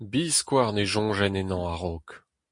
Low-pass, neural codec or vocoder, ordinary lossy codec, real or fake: 10.8 kHz; none; MP3, 48 kbps; real